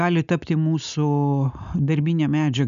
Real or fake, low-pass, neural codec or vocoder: real; 7.2 kHz; none